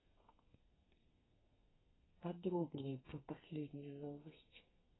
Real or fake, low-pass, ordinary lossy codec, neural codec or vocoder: fake; 7.2 kHz; AAC, 16 kbps; codec, 32 kHz, 1.9 kbps, SNAC